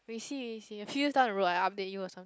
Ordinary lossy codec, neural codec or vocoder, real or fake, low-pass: none; none; real; none